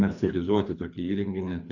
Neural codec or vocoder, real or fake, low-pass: codec, 24 kHz, 3 kbps, HILCodec; fake; 7.2 kHz